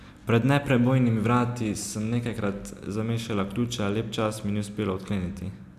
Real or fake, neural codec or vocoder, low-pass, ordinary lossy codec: real; none; 14.4 kHz; AAC, 96 kbps